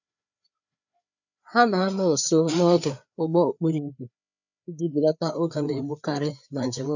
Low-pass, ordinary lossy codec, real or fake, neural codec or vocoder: 7.2 kHz; none; fake; codec, 16 kHz, 4 kbps, FreqCodec, larger model